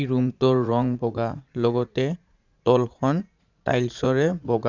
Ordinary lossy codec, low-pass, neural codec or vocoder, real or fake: none; 7.2 kHz; vocoder, 44.1 kHz, 80 mel bands, Vocos; fake